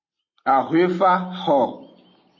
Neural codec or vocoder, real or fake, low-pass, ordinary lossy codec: none; real; 7.2 kHz; MP3, 32 kbps